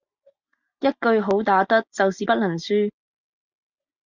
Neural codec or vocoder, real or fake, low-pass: none; real; 7.2 kHz